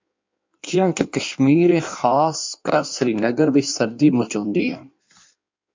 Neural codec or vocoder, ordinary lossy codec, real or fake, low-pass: codec, 16 kHz in and 24 kHz out, 1.1 kbps, FireRedTTS-2 codec; MP3, 64 kbps; fake; 7.2 kHz